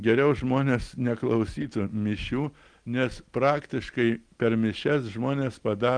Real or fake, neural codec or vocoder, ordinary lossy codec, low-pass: real; none; Opus, 24 kbps; 9.9 kHz